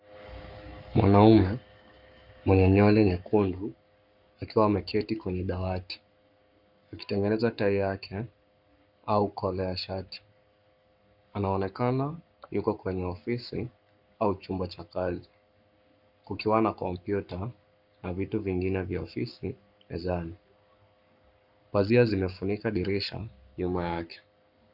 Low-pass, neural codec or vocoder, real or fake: 5.4 kHz; codec, 44.1 kHz, 7.8 kbps, Pupu-Codec; fake